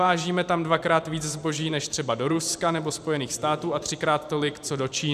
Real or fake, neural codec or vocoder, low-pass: fake; vocoder, 44.1 kHz, 128 mel bands every 512 samples, BigVGAN v2; 14.4 kHz